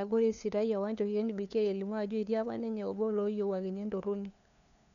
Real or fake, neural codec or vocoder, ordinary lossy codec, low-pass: fake; codec, 16 kHz, 2 kbps, FunCodec, trained on LibriTTS, 25 frames a second; none; 7.2 kHz